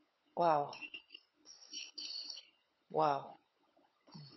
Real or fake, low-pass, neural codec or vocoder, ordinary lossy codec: fake; 7.2 kHz; codec, 16 kHz, 16 kbps, FunCodec, trained on LibriTTS, 50 frames a second; MP3, 24 kbps